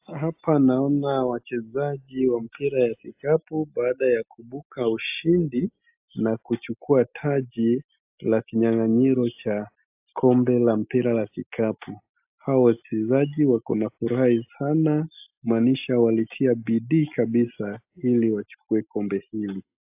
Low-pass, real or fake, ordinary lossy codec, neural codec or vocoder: 3.6 kHz; real; AAC, 32 kbps; none